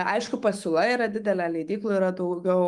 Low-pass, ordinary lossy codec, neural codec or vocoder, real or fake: 10.8 kHz; Opus, 32 kbps; autoencoder, 48 kHz, 128 numbers a frame, DAC-VAE, trained on Japanese speech; fake